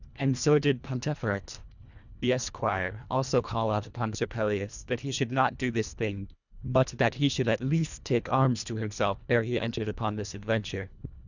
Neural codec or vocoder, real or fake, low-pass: codec, 24 kHz, 1.5 kbps, HILCodec; fake; 7.2 kHz